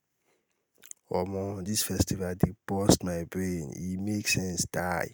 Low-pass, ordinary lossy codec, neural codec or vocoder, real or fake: none; none; none; real